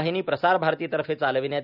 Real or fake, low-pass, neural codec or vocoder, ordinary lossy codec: real; 5.4 kHz; none; none